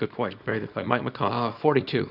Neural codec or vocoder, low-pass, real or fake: codec, 24 kHz, 0.9 kbps, WavTokenizer, small release; 5.4 kHz; fake